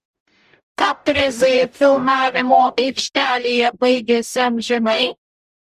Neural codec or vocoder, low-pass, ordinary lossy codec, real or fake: codec, 44.1 kHz, 0.9 kbps, DAC; 14.4 kHz; Opus, 64 kbps; fake